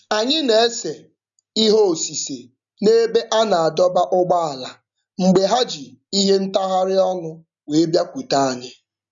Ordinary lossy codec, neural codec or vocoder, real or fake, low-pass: none; none; real; 7.2 kHz